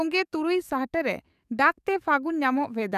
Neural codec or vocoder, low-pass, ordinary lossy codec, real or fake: codec, 44.1 kHz, 7.8 kbps, DAC; 14.4 kHz; none; fake